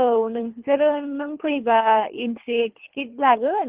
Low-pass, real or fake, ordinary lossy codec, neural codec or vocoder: 3.6 kHz; fake; Opus, 16 kbps; codec, 24 kHz, 3 kbps, HILCodec